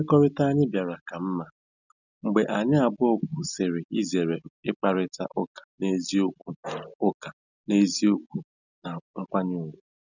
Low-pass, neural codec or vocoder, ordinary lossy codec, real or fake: 7.2 kHz; none; none; real